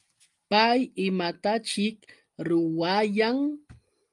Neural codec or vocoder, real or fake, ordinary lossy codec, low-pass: none; real; Opus, 32 kbps; 10.8 kHz